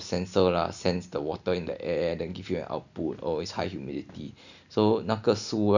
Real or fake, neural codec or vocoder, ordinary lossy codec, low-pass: real; none; none; 7.2 kHz